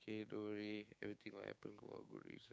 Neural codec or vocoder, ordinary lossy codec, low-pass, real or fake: codec, 16 kHz, 6 kbps, DAC; none; none; fake